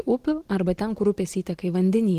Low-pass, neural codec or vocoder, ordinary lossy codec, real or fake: 14.4 kHz; none; Opus, 16 kbps; real